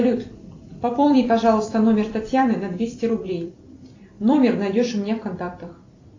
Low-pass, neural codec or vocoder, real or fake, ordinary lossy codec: 7.2 kHz; vocoder, 44.1 kHz, 128 mel bands every 256 samples, BigVGAN v2; fake; AAC, 48 kbps